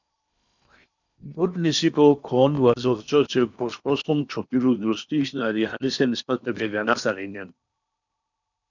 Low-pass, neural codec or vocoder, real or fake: 7.2 kHz; codec, 16 kHz in and 24 kHz out, 0.8 kbps, FocalCodec, streaming, 65536 codes; fake